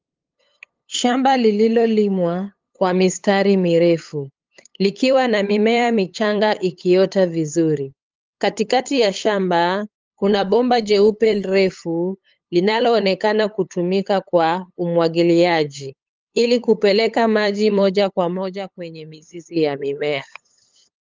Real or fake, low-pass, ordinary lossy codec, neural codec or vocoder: fake; 7.2 kHz; Opus, 24 kbps; codec, 16 kHz, 8 kbps, FunCodec, trained on LibriTTS, 25 frames a second